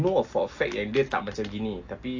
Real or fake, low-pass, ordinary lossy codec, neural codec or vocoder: real; 7.2 kHz; AAC, 48 kbps; none